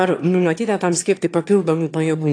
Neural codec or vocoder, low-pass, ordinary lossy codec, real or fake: autoencoder, 22.05 kHz, a latent of 192 numbers a frame, VITS, trained on one speaker; 9.9 kHz; AAC, 48 kbps; fake